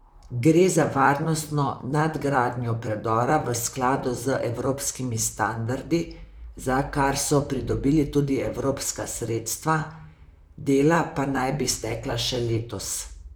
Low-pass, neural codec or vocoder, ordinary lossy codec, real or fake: none; vocoder, 44.1 kHz, 128 mel bands, Pupu-Vocoder; none; fake